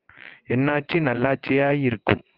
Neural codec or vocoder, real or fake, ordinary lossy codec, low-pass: vocoder, 22.05 kHz, 80 mel bands, WaveNeXt; fake; Opus, 32 kbps; 5.4 kHz